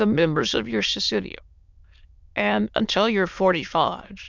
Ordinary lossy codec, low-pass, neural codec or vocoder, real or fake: MP3, 64 kbps; 7.2 kHz; autoencoder, 22.05 kHz, a latent of 192 numbers a frame, VITS, trained on many speakers; fake